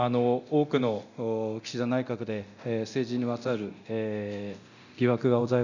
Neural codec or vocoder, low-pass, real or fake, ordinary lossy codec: codec, 24 kHz, 0.9 kbps, DualCodec; 7.2 kHz; fake; none